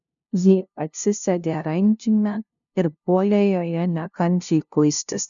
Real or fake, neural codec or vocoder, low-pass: fake; codec, 16 kHz, 0.5 kbps, FunCodec, trained on LibriTTS, 25 frames a second; 7.2 kHz